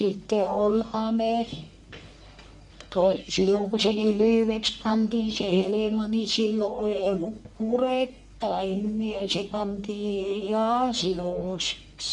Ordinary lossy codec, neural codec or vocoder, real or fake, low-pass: none; codec, 44.1 kHz, 1.7 kbps, Pupu-Codec; fake; 10.8 kHz